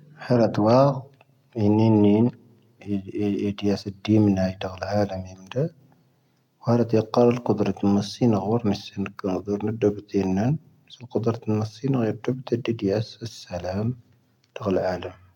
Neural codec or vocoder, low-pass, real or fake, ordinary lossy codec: none; 19.8 kHz; real; none